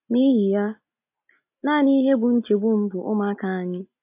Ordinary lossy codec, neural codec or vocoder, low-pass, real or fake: MP3, 32 kbps; none; 3.6 kHz; real